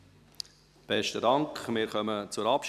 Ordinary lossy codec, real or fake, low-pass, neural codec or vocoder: none; real; 14.4 kHz; none